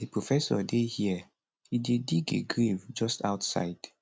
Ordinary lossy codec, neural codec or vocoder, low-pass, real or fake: none; none; none; real